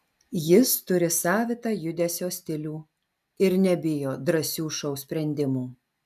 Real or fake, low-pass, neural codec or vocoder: real; 14.4 kHz; none